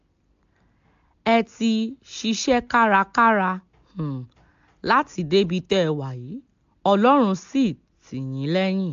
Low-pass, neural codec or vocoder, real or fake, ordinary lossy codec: 7.2 kHz; none; real; AAC, 64 kbps